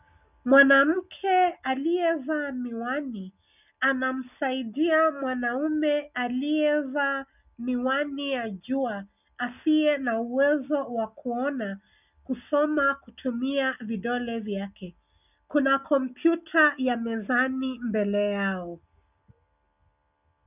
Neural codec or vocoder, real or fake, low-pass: none; real; 3.6 kHz